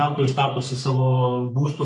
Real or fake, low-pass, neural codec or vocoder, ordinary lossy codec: fake; 10.8 kHz; codec, 44.1 kHz, 3.4 kbps, Pupu-Codec; AAC, 64 kbps